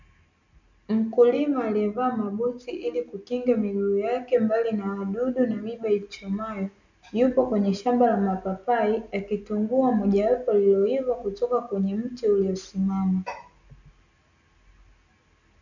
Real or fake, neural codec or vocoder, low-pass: real; none; 7.2 kHz